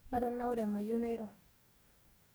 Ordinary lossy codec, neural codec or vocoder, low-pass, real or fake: none; codec, 44.1 kHz, 2.6 kbps, DAC; none; fake